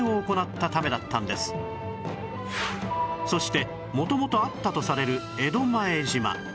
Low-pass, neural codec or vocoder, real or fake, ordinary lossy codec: none; none; real; none